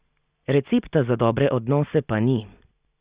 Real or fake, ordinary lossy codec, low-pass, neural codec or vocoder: real; Opus, 64 kbps; 3.6 kHz; none